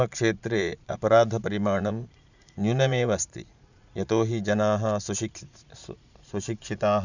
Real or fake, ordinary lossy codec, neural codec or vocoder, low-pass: fake; none; vocoder, 44.1 kHz, 80 mel bands, Vocos; 7.2 kHz